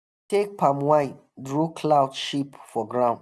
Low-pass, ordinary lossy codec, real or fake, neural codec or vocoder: none; none; real; none